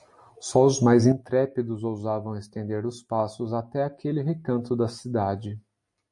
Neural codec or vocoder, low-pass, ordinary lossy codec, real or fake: none; 10.8 kHz; MP3, 48 kbps; real